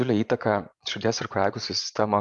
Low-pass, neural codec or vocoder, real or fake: 10.8 kHz; vocoder, 24 kHz, 100 mel bands, Vocos; fake